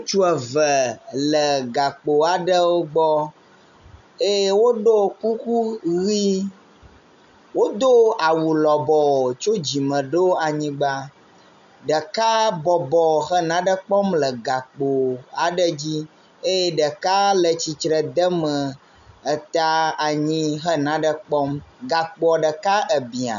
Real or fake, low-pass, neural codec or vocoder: real; 7.2 kHz; none